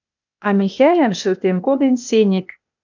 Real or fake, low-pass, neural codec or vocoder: fake; 7.2 kHz; codec, 16 kHz, 0.8 kbps, ZipCodec